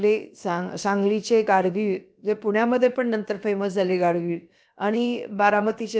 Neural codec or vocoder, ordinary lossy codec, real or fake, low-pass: codec, 16 kHz, about 1 kbps, DyCAST, with the encoder's durations; none; fake; none